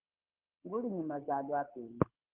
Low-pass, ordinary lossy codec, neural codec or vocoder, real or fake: 3.6 kHz; Opus, 32 kbps; codec, 24 kHz, 6 kbps, HILCodec; fake